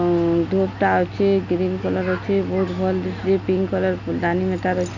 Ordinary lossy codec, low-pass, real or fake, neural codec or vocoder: none; 7.2 kHz; real; none